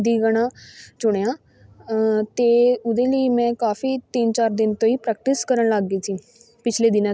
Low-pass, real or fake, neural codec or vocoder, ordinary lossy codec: none; real; none; none